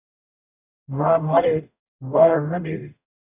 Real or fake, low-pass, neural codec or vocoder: fake; 3.6 kHz; codec, 44.1 kHz, 0.9 kbps, DAC